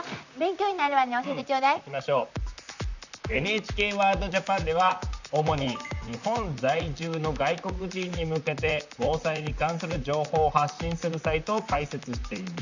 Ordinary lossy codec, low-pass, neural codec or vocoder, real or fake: none; 7.2 kHz; vocoder, 44.1 kHz, 128 mel bands, Pupu-Vocoder; fake